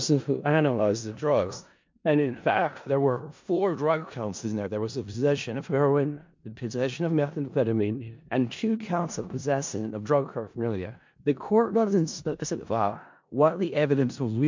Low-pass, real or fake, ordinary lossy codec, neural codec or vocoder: 7.2 kHz; fake; MP3, 48 kbps; codec, 16 kHz in and 24 kHz out, 0.4 kbps, LongCat-Audio-Codec, four codebook decoder